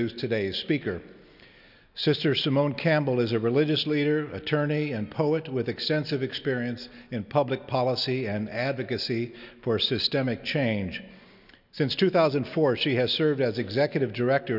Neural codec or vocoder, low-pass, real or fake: none; 5.4 kHz; real